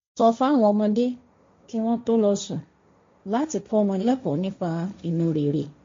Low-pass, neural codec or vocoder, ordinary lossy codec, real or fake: 7.2 kHz; codec, 16 kHz, 1.1 kbps, Voila-Tokenizer; MP3, 48 kbps; fake